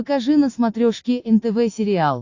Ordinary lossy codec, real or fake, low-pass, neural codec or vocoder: AAC, 48 kbps; real; 7.2 kHz; none